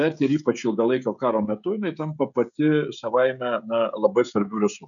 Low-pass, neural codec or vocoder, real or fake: 7.2 kHz; codec, 16 kHz, 16 kbps, FreqCodec, smaller model; fake